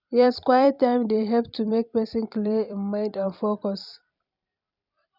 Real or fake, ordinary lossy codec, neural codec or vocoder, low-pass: fake; none; vocoder, 44.1 kHz, 128 mel bands every 256 samples, BigVGAN v2; 5.4 kHz